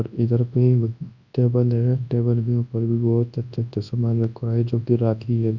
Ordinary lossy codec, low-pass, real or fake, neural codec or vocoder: none; 7.2 kHz; fake; codec, 24 kHz, 0.9 kbps, WavTokenizer, large speech release